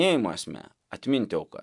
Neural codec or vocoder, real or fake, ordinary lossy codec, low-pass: none; real; MP3, 96 kbps; 10.8 kHz